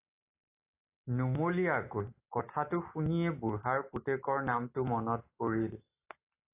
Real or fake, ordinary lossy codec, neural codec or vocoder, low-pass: real; AAC, 24 kbps; none; 3.6 kHz